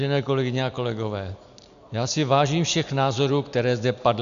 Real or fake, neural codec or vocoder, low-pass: real; none; 7.2 kHz